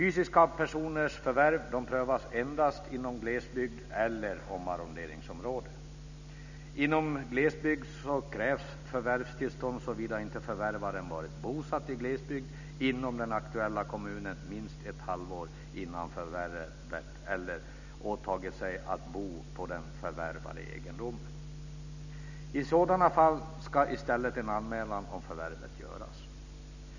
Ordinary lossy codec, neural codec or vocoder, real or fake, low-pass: none; none; real; 7.2 kHz